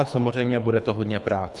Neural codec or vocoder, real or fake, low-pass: codec, 24 kHz, 3 kbps, HILCodec; fake; 10.8 kHz